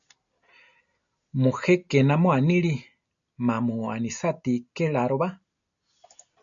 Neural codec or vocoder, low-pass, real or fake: none; 7.2 kHz; real